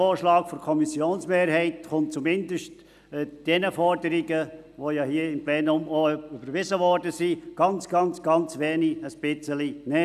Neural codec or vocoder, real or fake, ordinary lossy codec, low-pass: none; real; none; 14.4 kHz